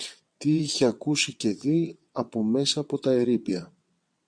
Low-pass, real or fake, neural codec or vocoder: 9.9 kHz; fake; vocoder, 22.05 kHz, 80 mel bands, WaveNeXt